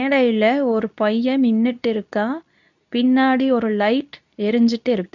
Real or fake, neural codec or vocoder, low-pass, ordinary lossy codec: fake; codec, 24 kHz, 0.9 kbps, WavTokenizer, medium speech release version 2; 7.2 kHz; none